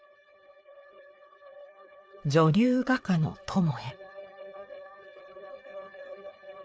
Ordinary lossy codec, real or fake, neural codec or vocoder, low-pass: none; fake; codec, 16 kHz, 4 kbps, FreqCodec, larger model; none